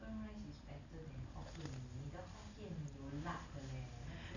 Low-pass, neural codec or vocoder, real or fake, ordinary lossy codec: 7.2 kHz; none; real; none